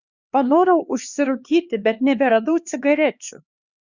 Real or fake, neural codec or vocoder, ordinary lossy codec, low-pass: fake; codec, 16 kHz, 2 kbps, X-Codec, HuBERT features, trained on LibriSpeech; Opus, 64 kbps; 7.2 kHz